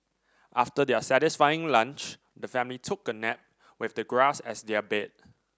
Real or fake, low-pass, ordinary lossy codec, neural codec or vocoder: real; none; none; none